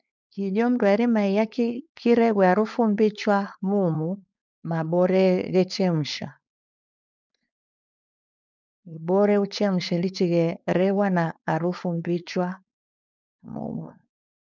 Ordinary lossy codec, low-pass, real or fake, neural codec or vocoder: none; 7.2 kHz; fake; codec, 16 kHz, 4.8 kbps, FACodec